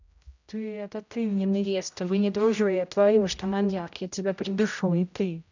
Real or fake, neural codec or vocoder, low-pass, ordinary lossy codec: fake; codec, 16 kHz, 0.5 kbps, X-Codec, HuBERT features, trained on general audio; 7.2 kHz; none